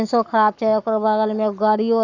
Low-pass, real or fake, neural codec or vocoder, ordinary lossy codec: 7.2 kHz; fake; codec, 44.1 kHz, 7.8 kbps, Pupu-Codec; none